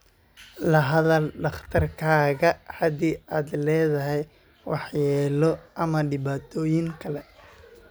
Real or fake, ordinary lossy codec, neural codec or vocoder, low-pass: real; none; none; none